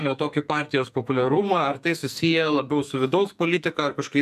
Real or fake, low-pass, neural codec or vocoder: fake; 14.4 kHz; codec, 44.1 kHz, 2.6 kbps, SNAC